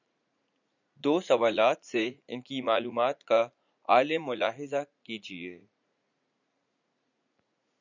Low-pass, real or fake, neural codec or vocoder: 7.2 kHz; fake; vocoder, 44.1 kHz, 80 mel bands, Vocos